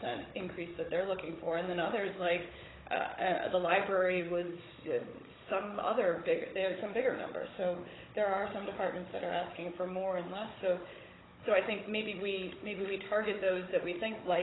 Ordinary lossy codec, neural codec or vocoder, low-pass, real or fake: AAC, 16 kbps; codec, 16 kHz, 16 kbps, FunCodec, trained on Chinese and English, 50 frames a second; 7.2 kHz; fake